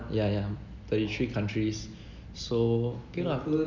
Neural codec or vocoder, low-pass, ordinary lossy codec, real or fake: none; 7.2 kHz; none; real